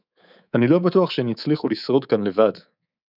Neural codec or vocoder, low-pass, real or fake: codec, 24 kHz, 3.1 kbps, DualCodec; 5.4 kHz; fake